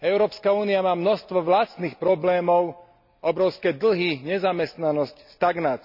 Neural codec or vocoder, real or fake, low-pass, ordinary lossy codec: none; real; 5.4 kHz; none